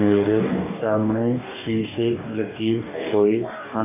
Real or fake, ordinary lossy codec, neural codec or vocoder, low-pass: fake; none; codec, 44.1 kHz, 2.6 kbps, DAC; 3.6 kHz